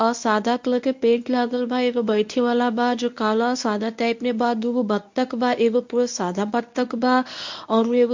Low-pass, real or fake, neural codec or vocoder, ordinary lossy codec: 7.2 kHz; fake; codec, 24 kHz, 0.9 kbps, WavTokenizer, medium speech release version 1; MP3, 48 kbps